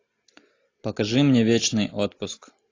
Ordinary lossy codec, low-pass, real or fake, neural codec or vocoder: AAC, 32 kbps; 7.2 kHz; real; none